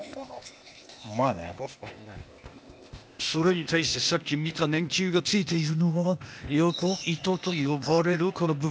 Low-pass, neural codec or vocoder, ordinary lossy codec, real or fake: none; codec, 16 kHz, 0.8 kbps, ZipCodec; none; fake